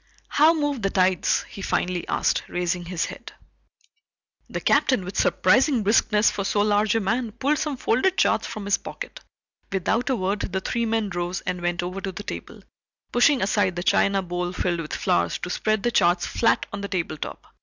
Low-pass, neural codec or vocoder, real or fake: 7.2 kHz; none; real